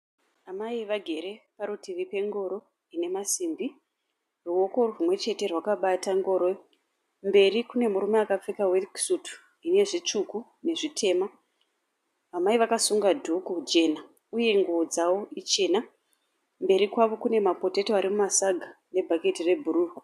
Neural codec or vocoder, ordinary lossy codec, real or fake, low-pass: none; AAC, 96 kbps; real; 14.4 kHz